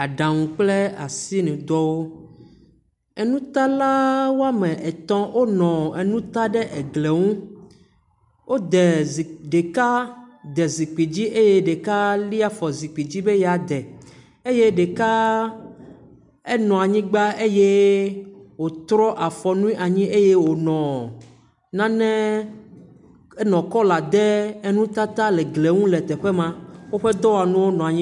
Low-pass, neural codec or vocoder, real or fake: 10.8 kHz; none; real